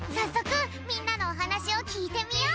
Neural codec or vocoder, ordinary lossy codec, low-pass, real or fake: none; none; none; real